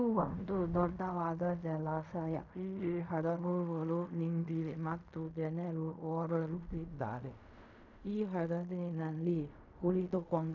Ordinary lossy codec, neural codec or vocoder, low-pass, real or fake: none; codec, 16 kHz in and 24 kHz out, 0.4 kbps, LongCat-Audio-Codec, fine tuned four codebook decoder; 7.2 kHz; fake